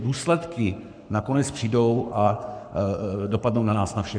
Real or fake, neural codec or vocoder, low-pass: fake; codec, 44.1 kHz, 7.8 kbps, Pupu-Codec; 9.9 kHz